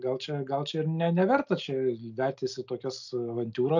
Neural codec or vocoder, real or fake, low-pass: none; real; 7.2 kHz